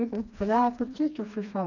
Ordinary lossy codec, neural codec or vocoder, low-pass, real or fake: none; codec, 16 kHz, 2 kbps, FreqCodec, smaller model; 7.2 kHz; fake